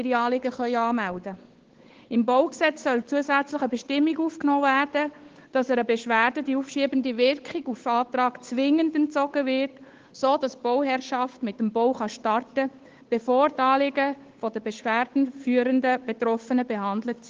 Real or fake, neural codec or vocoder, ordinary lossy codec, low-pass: fake; codec, 16 kHz, 8 kbps, FunCodec, trained on Chinese and English, 25 frames a second; Opus, 16 kbps; 7.2 kHz